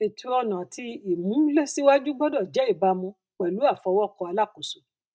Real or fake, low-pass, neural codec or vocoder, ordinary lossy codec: real; none; none; none